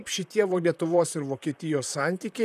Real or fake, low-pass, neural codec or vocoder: fake; 14.4 kHz; vocoder, 44.1 kHz, 128 mel bands, Pupu-Vocoder